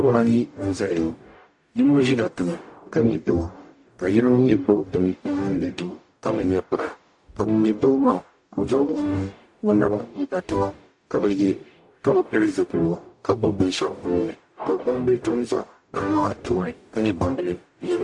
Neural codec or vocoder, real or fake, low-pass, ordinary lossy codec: codec, 44.1 kHz, 0.9 kbps, DAC; fake; 10.8 kHz; AAC, 64 kbps